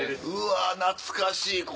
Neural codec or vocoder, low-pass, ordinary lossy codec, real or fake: none; none; none; real